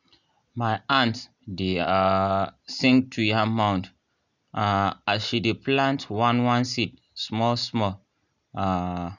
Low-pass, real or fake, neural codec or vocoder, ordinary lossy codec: 7.2 kHz; real; none; none